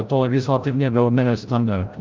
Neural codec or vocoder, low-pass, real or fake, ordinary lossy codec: codec, 16 kHz, 0.5 kbps, FreqCodec, larger model; 7.2 kHz; fake; Opus, 24 kbps